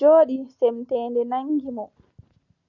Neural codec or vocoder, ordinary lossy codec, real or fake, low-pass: none; Opus, 64 kbps; real; 7.2 kHz